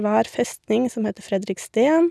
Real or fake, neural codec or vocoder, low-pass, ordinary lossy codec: real; none; none; none